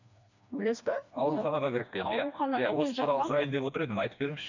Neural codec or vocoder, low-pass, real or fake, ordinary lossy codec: codec, 16 kHz, 2 kbps, FreqCodec, smaller model; 7.2 kHz; fake; none